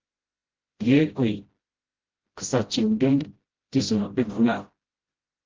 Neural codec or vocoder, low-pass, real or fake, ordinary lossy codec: codec, 16 kHz, 0.5 kbps, FreqCodec, smaller model; 7.2 kHz; fake; Opus, 16 kbps